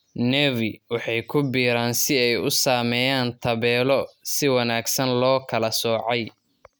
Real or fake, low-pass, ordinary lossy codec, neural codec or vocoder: real; none; none; none